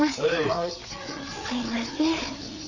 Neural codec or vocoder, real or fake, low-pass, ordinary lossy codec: codec, 16 kHz, 4 kbps, FreqCodec, larger model; fake; 7.2 kHz; none